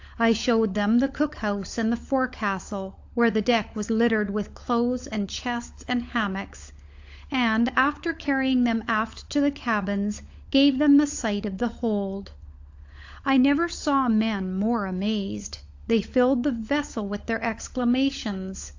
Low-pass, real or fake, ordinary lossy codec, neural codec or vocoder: 7.2 kHz; fake; AAC, 48 kbps; codec, 16 kHz, 16 kbps, FunCodec, trained on LibriTTS, 50 frames a second